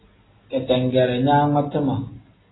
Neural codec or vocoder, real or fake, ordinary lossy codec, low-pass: none; real; AAC, 16 kbps; 7.2 kHz